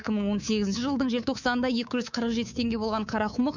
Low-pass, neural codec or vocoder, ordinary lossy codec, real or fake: 7.2 kHz; codec, 24 kHz, 3.1 kbps, DualCodec; none; fake